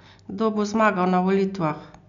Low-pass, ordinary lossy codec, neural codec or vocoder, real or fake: 7.2 kHz; none; none; real